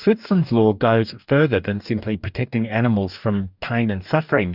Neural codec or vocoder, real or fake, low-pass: codec, 44.1 kHz, 2.6 kbps, DAC; fake; 5.4 kHz